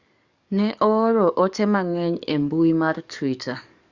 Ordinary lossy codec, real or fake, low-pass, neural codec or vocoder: Opus, 64 kbps; fake; 7.2 kHz; codec, 44.1 kHz, 7.8 kbps, DAC